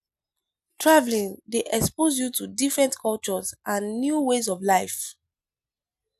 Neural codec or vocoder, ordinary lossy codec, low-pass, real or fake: none; none; 14.4 kHz; real